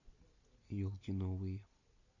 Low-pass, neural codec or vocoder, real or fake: 7.2 kHz; none; real